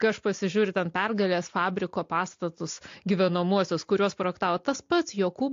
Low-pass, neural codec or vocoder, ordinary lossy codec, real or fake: 7.2 kHz; none; AAC, 48 kbps; real